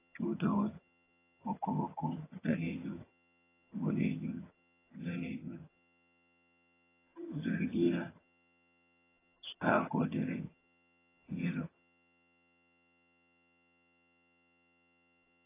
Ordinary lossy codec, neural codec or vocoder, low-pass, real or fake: AAC, 16 kbps; vocoder, 22.05 kHz, 80 mel bands, HiFi-GAN; 3.6 kHz; fake